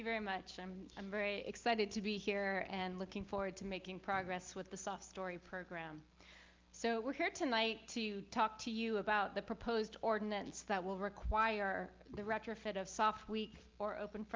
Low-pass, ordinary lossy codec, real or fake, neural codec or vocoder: 7.2 kHz; Opus, 32 kbps; real; none